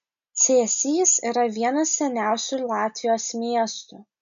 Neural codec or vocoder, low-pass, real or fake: none; 7.2 kHz; real